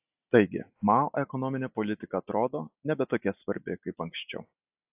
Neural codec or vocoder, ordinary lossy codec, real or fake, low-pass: none; AAC, 32 kbps; real; 3.6 kHz